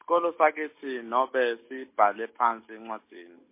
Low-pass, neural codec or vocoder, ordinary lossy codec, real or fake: 3.6 kHz; none; MP3, 24 kbps; real